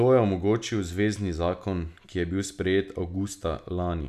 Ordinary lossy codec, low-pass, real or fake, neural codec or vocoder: none; 14.4 kHz; real; none